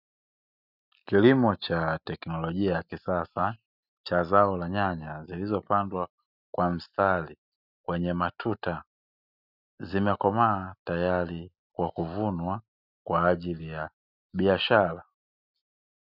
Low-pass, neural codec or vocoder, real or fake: 5.4 kHz; none; real